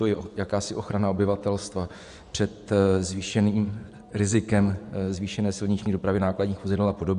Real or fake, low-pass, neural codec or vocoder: fake; 10.8 kHz; vocoder, 24 kHz, 100 mel bands, Vocos